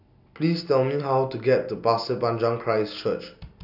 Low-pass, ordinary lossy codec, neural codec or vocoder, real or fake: 5.4 kHz; none; none; real